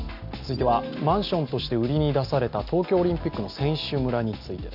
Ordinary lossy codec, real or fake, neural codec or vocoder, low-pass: AAC, 48 kbps; real; none; 5.4 kHz